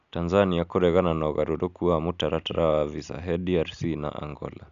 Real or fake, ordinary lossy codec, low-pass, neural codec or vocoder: real; none; 7.2 kHz; none